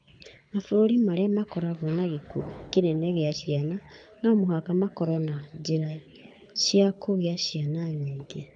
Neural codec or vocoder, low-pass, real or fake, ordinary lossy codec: codec, 24 kHz, 6 kbps, HILCodec; 9.9 kHz; fake; none